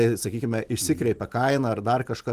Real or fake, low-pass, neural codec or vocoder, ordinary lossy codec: real; 14.4 kHz; none; Opus, 24 kbps